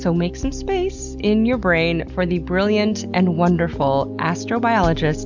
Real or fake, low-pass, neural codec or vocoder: real; 7.2 kHz; none